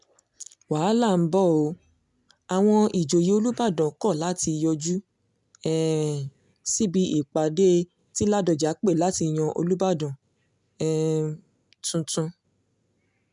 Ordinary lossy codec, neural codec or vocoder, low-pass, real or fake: none; none; 10.8 kHz; real